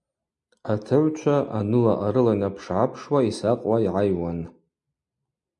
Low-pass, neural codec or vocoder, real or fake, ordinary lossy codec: 10.8 kHz; none; real; MP3, 64 kbps